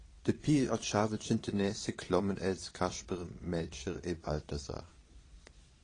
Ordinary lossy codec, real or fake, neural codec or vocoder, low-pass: AAC, 32 kbps; fake; vocoder, 22.05 kHz, 80 mel bands, Vocos; 9.9 kHz